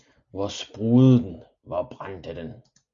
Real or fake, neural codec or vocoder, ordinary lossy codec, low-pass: real; none; Opus, 64 kbps; 7.2 kHz